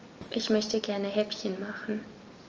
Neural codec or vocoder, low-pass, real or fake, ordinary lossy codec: none; 7.2 kHz; real; Opus, 24 kbps